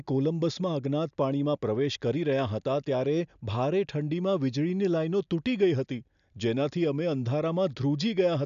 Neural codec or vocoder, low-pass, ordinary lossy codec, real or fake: none; 7.2 kHz; none; real